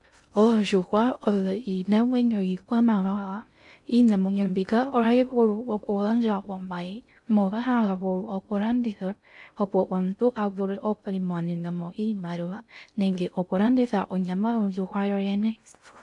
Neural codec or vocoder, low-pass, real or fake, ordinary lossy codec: codec, 16 kHz in and 24 kHz out, 0.6 kbps, FocalCodec, streaming, 2048 codes; 10.8 kHz; fake; AAC, 64 kbps